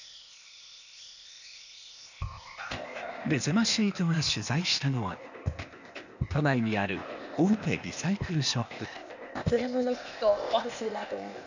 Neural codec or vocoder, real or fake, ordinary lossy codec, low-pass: codec, 16 kHz, 0.8 kbps, ZipCodec; fake; none; 7.2 kHz